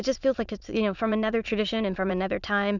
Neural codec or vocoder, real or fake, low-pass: autoencoder, 22.05 kHz, a latent of 192 numbers a frame, VITS, trained on many speakers; fake; 7.2 kHz